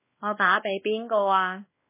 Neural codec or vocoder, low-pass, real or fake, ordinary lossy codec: codec, 16 kHz, 2 kbps, X-Codec, WavLM features, trained on Multilingual LibriSpeech; 3.6 kHz; fake; MP3, 16 kbps